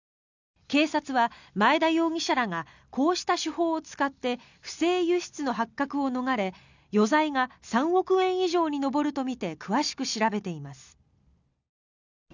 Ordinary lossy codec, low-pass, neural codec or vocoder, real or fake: none; 7.2 kHz; none; real